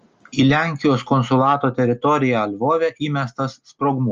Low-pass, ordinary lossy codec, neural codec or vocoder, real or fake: 7.2 kHz; Opus, 24 kbps; none; real